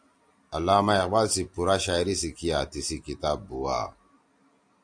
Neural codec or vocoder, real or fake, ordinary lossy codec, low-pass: none; real; AAC, 64 kbps; 9.9 kHz